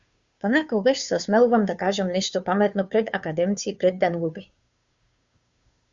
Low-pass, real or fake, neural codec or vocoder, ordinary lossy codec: 7.2 kHz; fake; codec, 16 kHz, 2 kbps, FunCodec, trained on Chinese and English, 25 frames a second; Opus, 64 kbps